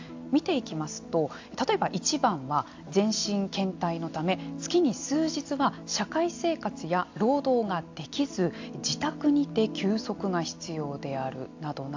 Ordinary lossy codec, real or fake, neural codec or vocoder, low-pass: AAC, 48 kbps; real; none; 7.2 kHz